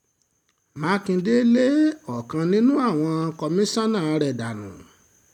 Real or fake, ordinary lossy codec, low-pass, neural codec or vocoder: real; none; 19.8 kHz; none